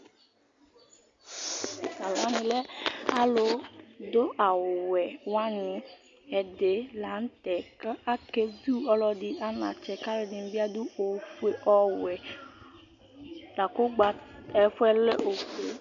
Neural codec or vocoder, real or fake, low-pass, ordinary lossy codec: none; real; 7.2 kHz; AAC, 64 kbps